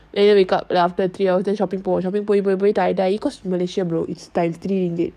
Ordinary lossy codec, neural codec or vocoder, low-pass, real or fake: none; codec, 44.1 kHz, 7.8 kbps, DAC; 14.4 kHz; fake